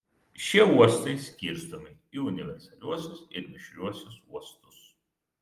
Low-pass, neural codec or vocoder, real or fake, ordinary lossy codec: 14.4 kHz; none; real; Opus, 32 kbps